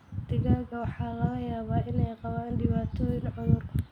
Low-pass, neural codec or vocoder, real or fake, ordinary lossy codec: 19.8 kHz; none; real; none